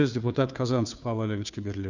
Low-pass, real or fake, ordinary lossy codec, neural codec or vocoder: 7.2 kHz; fake; none; codec, 16 kHz, 2 kbps, FunCodec, trained on Chinese and English, 25 frames a second